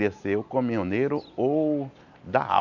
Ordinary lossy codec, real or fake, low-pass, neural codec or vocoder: none; real; 7.2 kHz; none